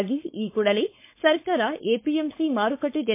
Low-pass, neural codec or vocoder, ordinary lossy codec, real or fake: 3.6 kHz; codec, 16 kHz, 4.8 kbps, FACodec; MP3, 24 kbps; fake